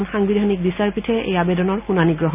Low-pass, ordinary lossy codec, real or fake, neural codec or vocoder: 3.6 kHz; none; real; none